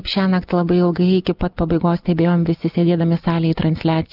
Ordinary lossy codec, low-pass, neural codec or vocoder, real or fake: Opus, 32 kbps; 5.4 kHz; none; real